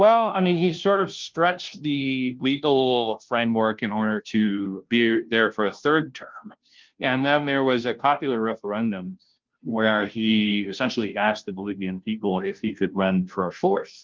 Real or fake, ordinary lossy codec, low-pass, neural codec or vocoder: fake; Opus, 32 kbps; 7.2 kHz; codec, 16 kHz, 0.5 kbps, FunCodec, trained on Chinese and English, 25 frames a second